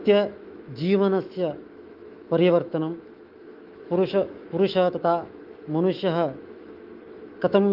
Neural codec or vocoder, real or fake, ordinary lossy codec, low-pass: autoencoder, 48 kHz, 128 numbers a frame, DAC-VAE, trained on Japanese speech; fake; Opus, 32 kbps; 5.4 kHz